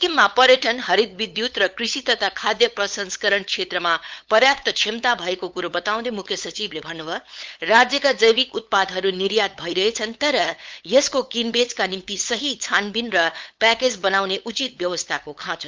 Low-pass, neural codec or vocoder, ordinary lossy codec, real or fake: 7.2 kHz; codec, 16 kHz, 8 kbps, FunCodec, trained on LibriTTS, 25 frames a second; Opus, 24 kbps; fake